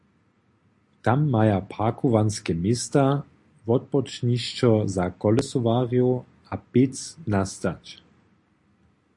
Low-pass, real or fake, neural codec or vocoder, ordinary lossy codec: 10.8 kHz; real; none; AAC, 64 kbps